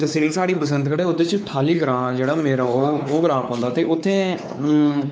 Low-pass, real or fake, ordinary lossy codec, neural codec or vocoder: none; fake; none; codec, 16 kHz, 4 kbps, X-Codec, WavLM features, trained on Multilingual LibriSpeech